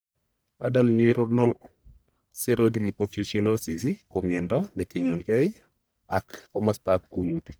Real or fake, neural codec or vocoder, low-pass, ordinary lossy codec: fake; codec, 44.1 kHz, 1.7 kbps, Pupu-Codec; none; none